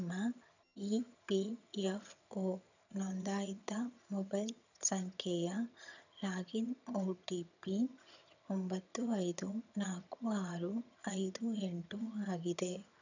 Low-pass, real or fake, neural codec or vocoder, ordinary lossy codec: 7.2 kHz; fake; vocoder, 22.05 kHz, 80 mel bands, HiFi-GAN; none